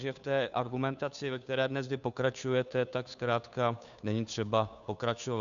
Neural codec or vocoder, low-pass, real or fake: codec, 16 kHz, 2 kbps, FunCodec, trained on Chinese and English, 25 frames a second; 7.2 kHz; fake